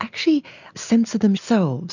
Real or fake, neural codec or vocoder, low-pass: real; none; 7.2 kHz